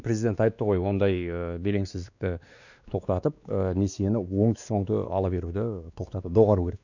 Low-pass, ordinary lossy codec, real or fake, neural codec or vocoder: 7.2 kHz; none; fake; codec, 16 kHz, 2 kbps, X-Codec, WavLM features, trained on Multilingual LibriSpeech